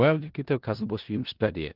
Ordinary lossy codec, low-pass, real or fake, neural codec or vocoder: Opus, 16 kbps; 5.4 kHz; fake; codec, 16 kHz in and 24 kHz out, 0.4 kbps, LongCat-Audio-Codec, four codebook decoder